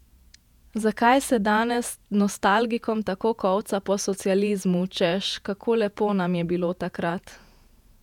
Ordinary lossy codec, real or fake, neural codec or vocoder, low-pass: none; fake; vocoder, 48 kHz, 128 mel bands, Vocos; 19.8 kHz